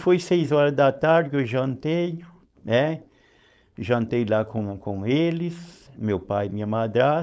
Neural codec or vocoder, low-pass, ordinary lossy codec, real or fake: codec, 16 kHz, 4.8 kbps, FACodec; none; none; fake